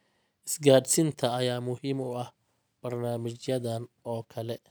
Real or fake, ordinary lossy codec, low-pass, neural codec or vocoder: real; none; none; none